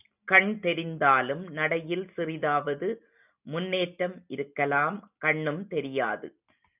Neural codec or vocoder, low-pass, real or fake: none; 3.6 kHz; real